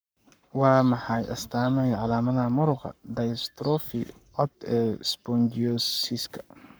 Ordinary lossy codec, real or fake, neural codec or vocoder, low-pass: none; fake; codec, 44.1 kHz, 7.8 kbps, Pupu-Codec; none